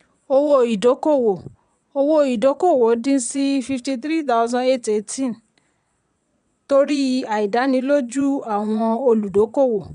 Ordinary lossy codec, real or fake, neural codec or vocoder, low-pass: none; fake; vocoder, 22.05 kHz, 80 mel bands, Vocos; 9.9 kHz